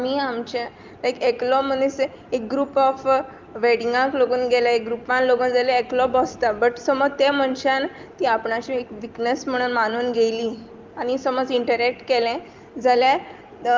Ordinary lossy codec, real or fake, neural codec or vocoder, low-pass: Opus, 32 kbps; real; none; 7.2 kHz